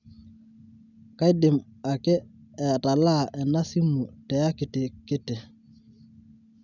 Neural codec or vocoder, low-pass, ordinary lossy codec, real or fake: none; 7.2 kHz; none; real